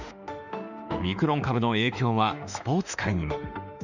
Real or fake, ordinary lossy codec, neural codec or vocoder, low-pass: fake; none; autoencoder, 48 kHz, 32 numbers a frame, DAC-VAE, trained on Japanese speech; 7.2 kHz